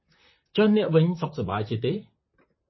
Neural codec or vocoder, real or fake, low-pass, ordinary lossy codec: vocoder, 44.1 kHz, 128 mel bands, Pupu-Vocoder; fake; 7.2 kHz; MP3, 24 kbps